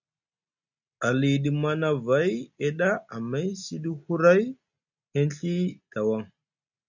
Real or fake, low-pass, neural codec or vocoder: real; 7.2 kHz; none